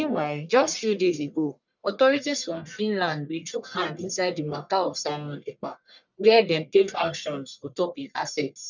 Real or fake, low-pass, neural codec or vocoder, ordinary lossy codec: fake; 7.2 kHz; codec, 44.1 kHz, 1.7 kbps, Pupu-Codec; none